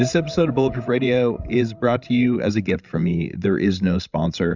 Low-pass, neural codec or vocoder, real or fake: 7.2 kHz; codec, 16 kHz, 16 kbps, FreqCodec, larger model; fake